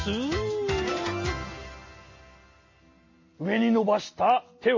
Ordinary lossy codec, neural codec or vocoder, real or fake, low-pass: MP3, 32 kbps; none; real; 7.2 kHz